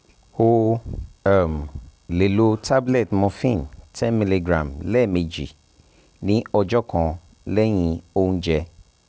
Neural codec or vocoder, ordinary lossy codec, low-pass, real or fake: none; none; none; real